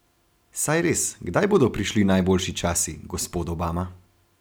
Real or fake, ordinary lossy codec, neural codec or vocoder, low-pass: real; none; none; none